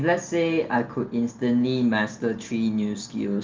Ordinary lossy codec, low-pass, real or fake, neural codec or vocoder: Opus, 32 kbps; 7.2 kHz; real; none